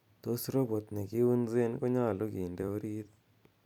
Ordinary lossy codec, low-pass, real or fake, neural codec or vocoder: none; 19.8 kHz; fake; vocoder, 44.1 kHz, 128 mel bands every 256 samples, BigVGAN v2